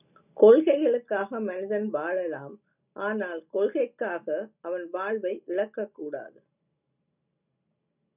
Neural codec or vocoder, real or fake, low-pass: none; real; 3.6 kHz